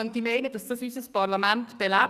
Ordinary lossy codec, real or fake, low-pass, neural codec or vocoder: none; fake; 14.4 kHz; codec, 44.1 kHz, 2.6 kbps, SNAC